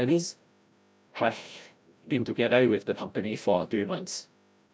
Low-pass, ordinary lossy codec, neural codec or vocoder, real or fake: none; none; codec, 16 kHz, 0.5 kbps, FreqCodec, larger model; fake